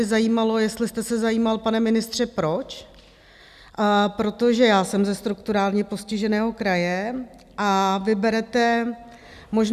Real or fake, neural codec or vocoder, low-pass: real; none; 14.4 kHz